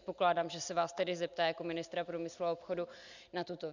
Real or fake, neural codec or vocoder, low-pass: real; none; 7.2 kHz